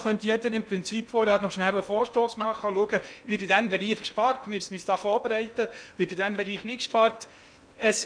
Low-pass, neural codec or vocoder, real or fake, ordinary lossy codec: 9.9 kHz; codec, 16 kHz in and 24 kHz out, 0.8 kbps, FocalCodec, streaming, 65536 codes; fake; none